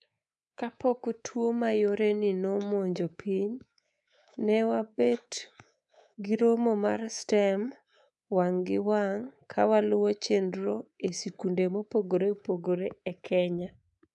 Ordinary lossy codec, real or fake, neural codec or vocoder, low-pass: none; fake; codec, 24 kHz, 3.1 kbps, DualCodec; 10.8 kHz